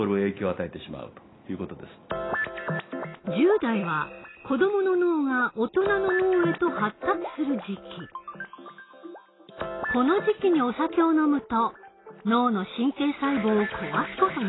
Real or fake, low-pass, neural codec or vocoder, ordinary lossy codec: real; 7.2 kHz; none; AAC, 16 kbps